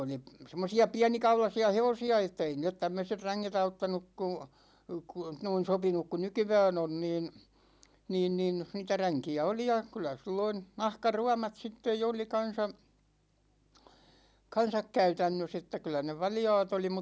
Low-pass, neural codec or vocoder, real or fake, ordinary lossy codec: none; none; real; none